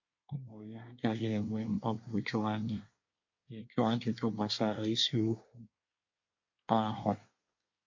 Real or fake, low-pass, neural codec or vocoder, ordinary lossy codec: fake; 7.2 kHz; codec, 24 kHz, 1 kbps, SNAC; MP3, 48 kbps